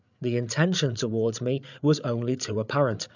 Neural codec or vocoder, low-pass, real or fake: codec, 16 kHz, 8 kbps, FreqCodec, larger model; 7.2 kHz; fake